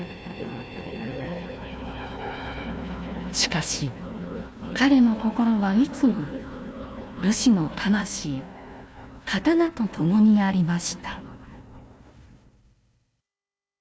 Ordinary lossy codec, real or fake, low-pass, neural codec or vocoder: none; fake; none; codec, 16 kHz, 1 kbps, FunCodec, trained on Chinese and English, 50 frames a second